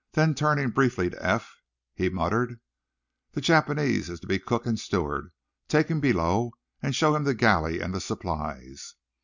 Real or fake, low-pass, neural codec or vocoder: real; 7.2 kHz; none